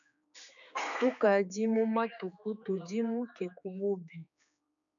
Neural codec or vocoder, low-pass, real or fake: codec, 16 kHz, 4 kbps, X-Codec, HuBERT features, trained on general audio; 7.2 kHz; fake